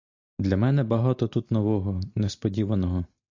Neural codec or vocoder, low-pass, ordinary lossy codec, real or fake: none; 7.2 kHz; AAC, 48 kbps; real